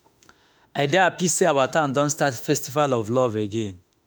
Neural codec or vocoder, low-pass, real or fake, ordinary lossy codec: autoencoder, 48 kHz, 32 numbers a frame, DAC-VAE, trained on Japanese speech; none; fake; none